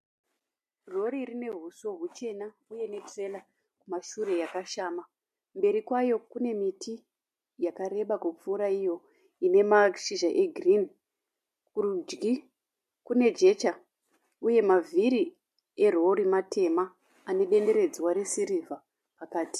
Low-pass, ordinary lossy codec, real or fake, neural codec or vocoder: 14.4 kHz; MP3, 64 kbps; real; none